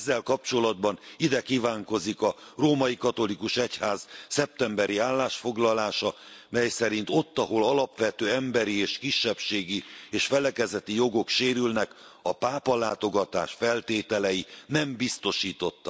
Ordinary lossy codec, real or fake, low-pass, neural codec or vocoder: none; real; none; none